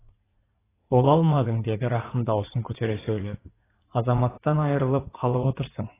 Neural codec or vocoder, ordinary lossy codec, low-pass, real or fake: vocoder, 44.1 kHz, 80 mel bands, Vocos; AAC, 16 kbps; 3.6 kHz; fake